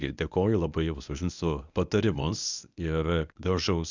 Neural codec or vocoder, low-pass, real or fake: codec, 24 kHz, 0.9 kbps, WavTokenizer, medium speech release version 1; 7.2 kHz; fake